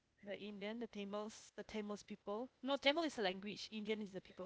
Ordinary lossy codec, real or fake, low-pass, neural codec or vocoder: none; fake; none; codec, 16 kHz, 0.8 kbps, ZipCodec